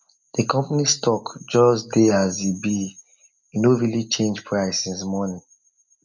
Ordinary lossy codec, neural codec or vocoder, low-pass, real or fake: none; none; 7.2 kHz; real